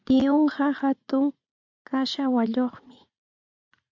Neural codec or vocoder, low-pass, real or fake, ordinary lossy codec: vocoder, 44.1 kHz, 80 mel bands, Vocos; 7.2 kHz; fake; MP3, 64 kbps